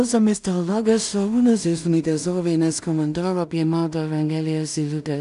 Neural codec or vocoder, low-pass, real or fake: codec, 16 kHz in and 24 kHz out, 0.4 kbps, LongCat-Audio-Codec, two codebook decoder; 10.8 kHz; fake